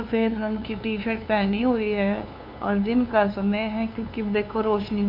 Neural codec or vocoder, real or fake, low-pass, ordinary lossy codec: codec, 16 kHz, 2 kbps, FunCodec, trained on LibriTTS, 25 frames a second; fake; 5.4 kHz; none